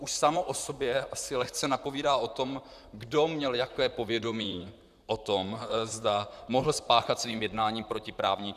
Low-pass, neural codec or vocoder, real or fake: 14.4 kHz; vocoder, 44.1 kHz, 128 mel bands, Pupu-Vocoder; fake